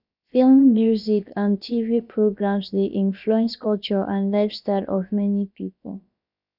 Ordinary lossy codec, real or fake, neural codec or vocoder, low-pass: none; fake; codec, 16 kHz, about 1 kbps, DyCAST, with the encoder's durations; 5.4 kHz